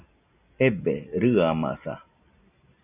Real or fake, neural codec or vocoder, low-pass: real; none; 3.6 kHz